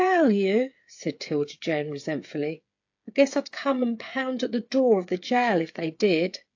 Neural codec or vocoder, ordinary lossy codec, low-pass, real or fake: codec, 16 kHz, 8 kbps, FreqCodec, smaller model; AAC, 48 kbps; 7.2 kHz; fake